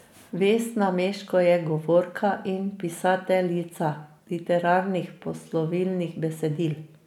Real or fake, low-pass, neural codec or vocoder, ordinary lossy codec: real; 19.8 kHz; none; none